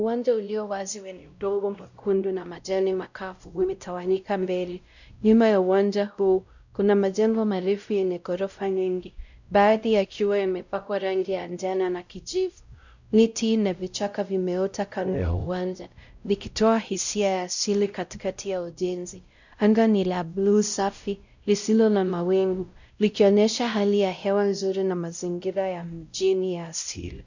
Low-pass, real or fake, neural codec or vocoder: 7.2 kHz; fake; codec, 16 kHz, 0.5 kbps, X-Codec, WavLM features, trained on Multilingual LibriSpeech